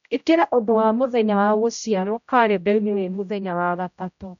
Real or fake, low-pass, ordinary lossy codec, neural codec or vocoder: fake; 7.2 kHz; none; codec, 16 kHz, 0.5 kbps, X-Codec, HuBERT features, trained on general audio